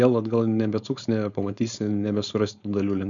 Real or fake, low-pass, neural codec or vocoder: fake; 7.2 kHz; codec, 16 kHz, 4.8 kbps, FACodec